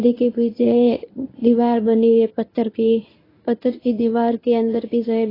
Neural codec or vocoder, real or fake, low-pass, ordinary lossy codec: codec, 24 kHz, 0.9 kbps, WavTokenizer, medium speech release version 1; fake; 5.4 kHz; AAC, 24 kbps